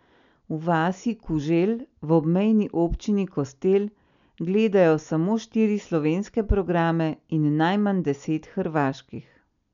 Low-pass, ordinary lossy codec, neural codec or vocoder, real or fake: 7.2 kHz; none; none; real